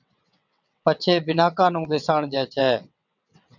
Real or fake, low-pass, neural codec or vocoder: fake; 7.2 kHz; vocoder, 22.05 kHz, 80 mel bands, WaveNeXt